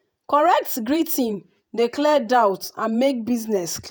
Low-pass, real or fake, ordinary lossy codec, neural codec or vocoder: none; fake; none; vocoder, 48 kHz, 128 mel bands, Vocos